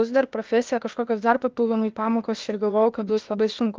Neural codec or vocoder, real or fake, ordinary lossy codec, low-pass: codec, 16 kHz, 0.8 kbps, ZipCodec; fake; Opus, 24 kbps; 7.2 kHz